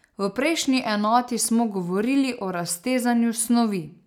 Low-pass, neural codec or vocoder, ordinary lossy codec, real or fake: 19.8 kHz; none; none; real